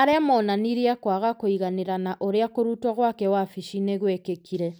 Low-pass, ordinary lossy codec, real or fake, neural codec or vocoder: none; none; real; none